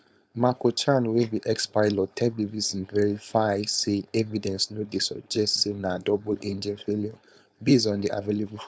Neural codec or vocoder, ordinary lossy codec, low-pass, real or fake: codec, 16 kHz, 4.8 kbps, FACodec; none; none; fake